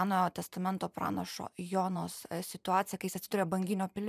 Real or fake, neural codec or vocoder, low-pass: fake; vocoder, 44.1 kHz, 128 mel bands every 512 samples, BigVGAN v2; 14.4 kHz